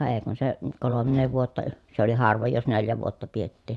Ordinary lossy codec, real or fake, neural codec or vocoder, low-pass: none; fake; vocoder, 24 kHz, 100 mel bands, Vocos; none